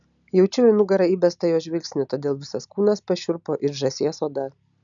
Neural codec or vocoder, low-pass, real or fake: none; 7.2 kHz; real